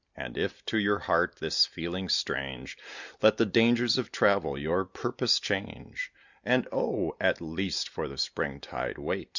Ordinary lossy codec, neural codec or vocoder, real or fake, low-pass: Opus, 64 kbps; none; real; 7.2 kHz